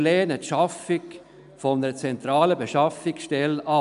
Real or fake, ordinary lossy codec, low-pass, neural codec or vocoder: real; none; 10.8 kHz; none